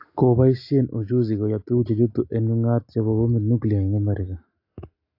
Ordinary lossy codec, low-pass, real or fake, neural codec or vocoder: AAC, 32 kbps; 5.4 kHz; real; none